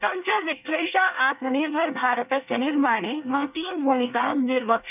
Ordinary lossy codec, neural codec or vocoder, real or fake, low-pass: none; codec, 24 kHz, 1 kbps, SNAC; fake; 3.6 kHz